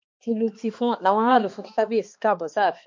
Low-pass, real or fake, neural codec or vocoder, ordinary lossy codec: 7.2 kHz; fake; codec, 16 kHz, 2 kbps, X-Codec, HuBERT features, trained on balanced general audio; MP3, 48 kbps